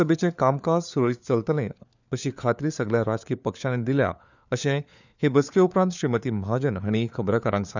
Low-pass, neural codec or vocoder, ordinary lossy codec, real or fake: 7.2 kHz; codec, 16 kHz, 4 kbps, FunCodec, trained on LibriTTS, 50 frames a second; none; fake